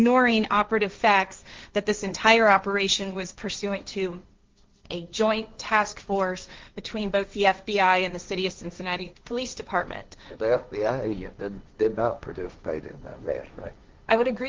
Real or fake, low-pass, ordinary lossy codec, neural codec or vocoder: fake; 7.2 kHz; Opus, 32 kbps; codec, 16 kHz, 1.1 kbps, Voila-Tokenizer